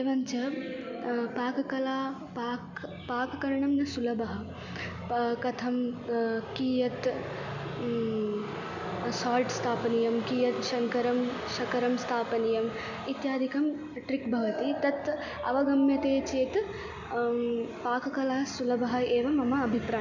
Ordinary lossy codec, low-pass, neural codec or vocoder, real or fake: none; 7.2 kHz; none; real